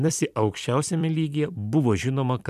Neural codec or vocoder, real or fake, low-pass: vocoder, 44.1 kHz, 128 mel bands every 256 samples, BigVGAN v2; fake; 14.4 kHz